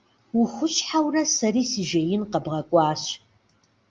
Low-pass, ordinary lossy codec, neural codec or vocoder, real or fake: 7.2 kHz; Opus, 32 kbps; none; real